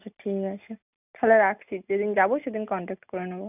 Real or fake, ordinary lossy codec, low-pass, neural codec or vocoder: real; none; 3.6 kHz; none